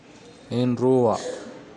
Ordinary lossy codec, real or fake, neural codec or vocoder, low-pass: none; real; none; 10.8 kHz